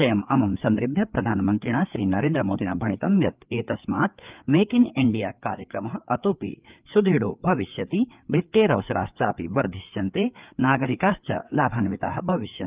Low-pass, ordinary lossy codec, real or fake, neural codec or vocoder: 3.6 kHz; Opus, 32 kbps; fake; codec, 16 kHz, 4 kbps, FreqCodec, larger model